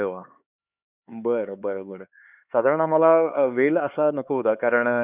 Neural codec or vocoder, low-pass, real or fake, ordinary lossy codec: codec, 16 kHz, 4 kbps, X-Codec, HuBERT features, trained on LibriSpeech; 3.6 kHz; fake; none